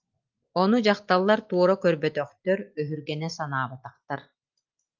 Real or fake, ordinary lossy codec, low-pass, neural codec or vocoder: real; Opus, 24 kbps; 7.2 kHz; none